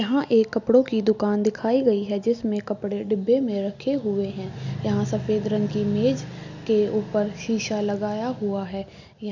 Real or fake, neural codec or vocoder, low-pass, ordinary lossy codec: real; none; 7.2 kHz; none